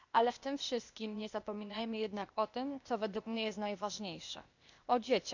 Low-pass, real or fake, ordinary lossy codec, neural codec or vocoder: 7.2 kHz; fake; none; codec, 16 kHz, 0.8 kbps, ZipCodec